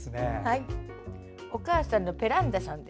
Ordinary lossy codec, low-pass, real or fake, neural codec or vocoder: none; none; real; none